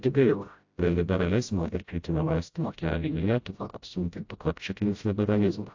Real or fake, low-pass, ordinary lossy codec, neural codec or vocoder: fake; 7.2 kHz; AAC, 48 kbps; codec, 16 kHz, 0.5 kbps, FreqCodec, smaller model